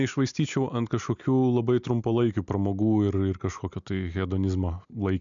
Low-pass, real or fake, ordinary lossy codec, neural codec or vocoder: 7.2 kHz; real; MP3, 96 kbps; none